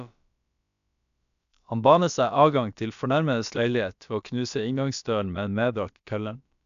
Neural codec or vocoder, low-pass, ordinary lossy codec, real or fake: codec, 16 kHz, about 1 kbps, DyCAST, with the encoder's durations; 7.2 kHz; none; fake